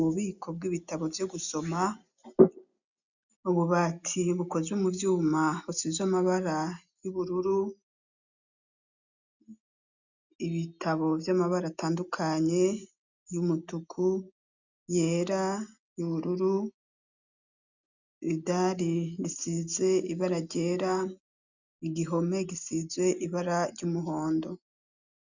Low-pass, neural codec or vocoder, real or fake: 7.2 kHz; none; real